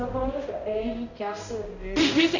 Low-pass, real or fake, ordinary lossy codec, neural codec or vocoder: 7.2 kHz; fake; none; codec, 16 kHz, 0.5 kbps, X-Codec, HuBERT features, trained on balanced general audio